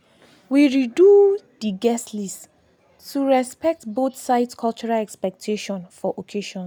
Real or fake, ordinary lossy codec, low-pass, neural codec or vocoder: real; none; none; none